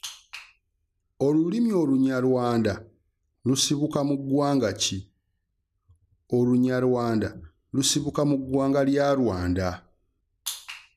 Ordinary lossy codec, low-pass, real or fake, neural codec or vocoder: none; 14.4 kHz; real; none